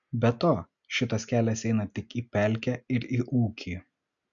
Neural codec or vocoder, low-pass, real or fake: none; 7.2 kHz; real